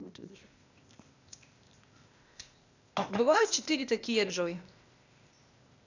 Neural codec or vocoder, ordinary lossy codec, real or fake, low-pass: codec, 16 kHz, 0.8 kbps, ZipCodec; none; fake; 7.2 kHz